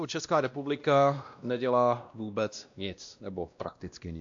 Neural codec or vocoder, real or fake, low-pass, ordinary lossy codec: codec, 16 kHz, 1 kbps, X-Codec, WavLM features, trained on Multilingual LibriSpeech; fake; 7.2 kHz; AAC, 64 kbps